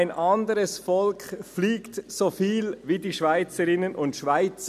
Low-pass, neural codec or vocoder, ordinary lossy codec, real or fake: 14.4 kHz; none; none; real